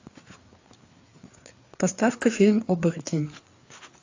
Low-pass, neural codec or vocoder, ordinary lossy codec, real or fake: 7.2 kHz; codec, 16 kHz, 4 kbps, FreqCodec, larger model; AAC, 48 kbps; fake